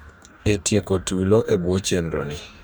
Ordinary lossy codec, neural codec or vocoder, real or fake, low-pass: none; codec, 44.1 kHz, 2.6 kbps, DAC; fake; none